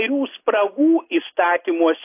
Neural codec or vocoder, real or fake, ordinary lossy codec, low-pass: none; real; AAC, 24 kbps; 3.6 kHz